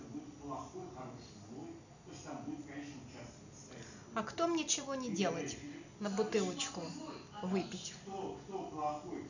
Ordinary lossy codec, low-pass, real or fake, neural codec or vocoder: none; 7.2 kHz; real; none